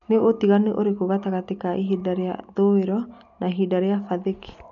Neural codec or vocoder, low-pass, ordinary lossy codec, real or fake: none; 7.2 kHz; none; real